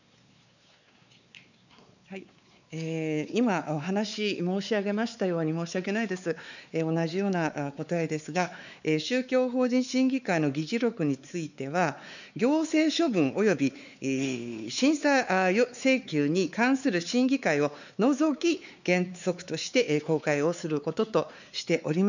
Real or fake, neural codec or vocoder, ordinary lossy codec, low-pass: fake; codec, 16 kHz, 4 kbps, X-Codec, WavLM features, trained on Multilingual LibriSpeech; none; 7.2 kHz